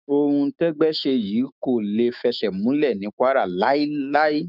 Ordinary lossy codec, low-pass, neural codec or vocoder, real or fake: none; 5.4 kHz; autoencoder, 48 kHz, 128 numbers a frame, DAC-VAE, trained on Japanese speech; fake